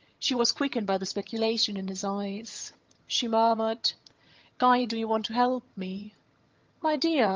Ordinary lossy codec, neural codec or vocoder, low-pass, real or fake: Opus, 32 kbps; vocoder, 22.05 kHz, 80 mel bands, HiFi-GAN; 7.2 kHz; fake